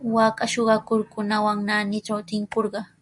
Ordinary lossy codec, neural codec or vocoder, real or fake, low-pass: MP3, 64 kbps; none; real; 10.8 kHz